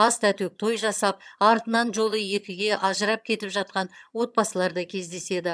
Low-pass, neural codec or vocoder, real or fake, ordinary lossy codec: none; vocoder, 22.05 kHz, 80 mel bands, HiFi-GAN; fake; none